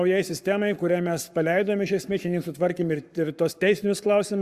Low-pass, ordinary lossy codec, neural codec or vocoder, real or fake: 14.4 kHz; Opus, 64 kbps; codec, 44.1 kHz, 7.8 kbps, Pupu-Codec; fake